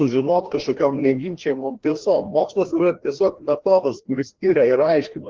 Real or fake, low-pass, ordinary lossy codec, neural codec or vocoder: fake; 7.2 kHz; Opus, 16 kbps; codec, 16 kHz, 1 kbps, FreqCodec, larger model